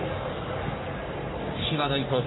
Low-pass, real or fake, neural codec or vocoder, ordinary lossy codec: 7.2 kHz; fake; codec, 44.1 kHz, 3.4 kbps, Pupu-Codec; AAC, 16 kbps